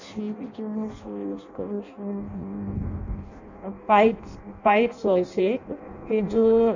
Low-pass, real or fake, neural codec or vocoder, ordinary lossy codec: 7.2 kHz; fake; codec, 16 kHz in and 24 kHz out, 0.6 kbps, FireRedTTS-2 codec; none